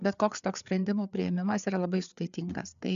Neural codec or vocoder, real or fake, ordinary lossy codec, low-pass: codec, 16 kHz, 4 kbps, FreqCodec, larger model; fake; MP3, 96 kbps; 7.2 kHz